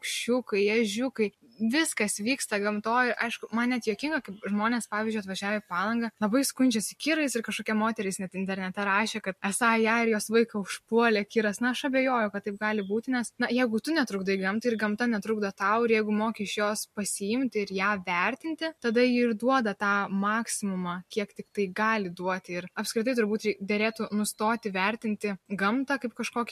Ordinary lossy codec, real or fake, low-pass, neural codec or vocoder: MP3, 64 kbps; real; 14.4 kHz; none